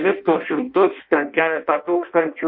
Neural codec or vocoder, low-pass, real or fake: codec, 16 kHz in and 24 kHz out, 0.6 kbps, FireRedTTS-2 codec; 5.4 kHz; fake